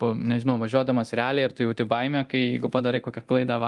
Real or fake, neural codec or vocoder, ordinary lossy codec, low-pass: fake; codec, 24 kHz, 0.9 kbps, DualCodec; Opus, 32 kbps; 10.8 kHz